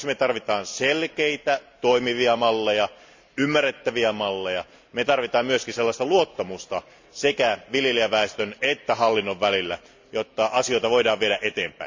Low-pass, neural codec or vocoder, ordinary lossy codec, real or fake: 7.2 kHz; none; MP3, 64 kbps; real